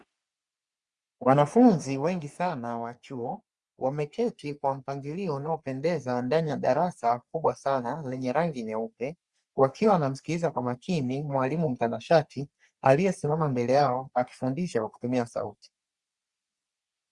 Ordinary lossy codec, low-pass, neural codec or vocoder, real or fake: Opus, 64 kbps; 10.8 kHz; codec, 44.1 kHz, 3.4 kbps, Pupu-Codec; fake